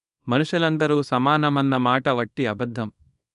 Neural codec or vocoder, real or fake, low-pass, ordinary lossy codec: codec, 24 kHz, 0.9 kbps, WavTokenizer, small release; fake; 10.8 kHz; none